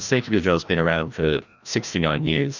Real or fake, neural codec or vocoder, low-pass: fake; codec, 16 kHz, 1 kbps, FreqCodec, larger model; 7.2 kHz